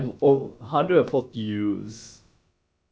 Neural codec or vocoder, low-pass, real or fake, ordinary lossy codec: codec, 16 kHz, about 1 kbps, DyCAST, with the encoder's durations; none; fake; none